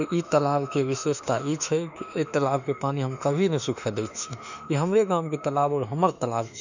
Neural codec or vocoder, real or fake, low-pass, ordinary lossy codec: autoencoder, 48 kHz, 32 numbers a frame, DAC-VAE, trained on Japanese speech; fake; 7.2 kHz; none